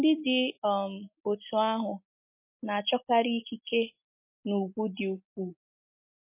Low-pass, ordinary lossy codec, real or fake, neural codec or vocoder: 3.6 kHz; MP3, 24 kbps; real; none